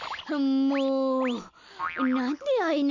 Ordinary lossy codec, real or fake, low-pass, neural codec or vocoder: none; real; 7.2 kHz; none